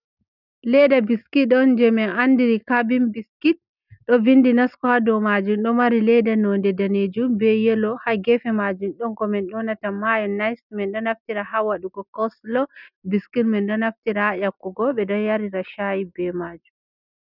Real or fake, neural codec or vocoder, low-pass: real; none; 5.4 kHz